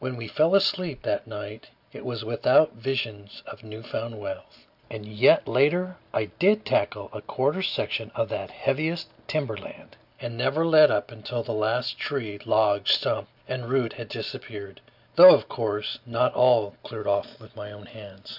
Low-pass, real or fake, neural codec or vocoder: 5.4 kHz; real; none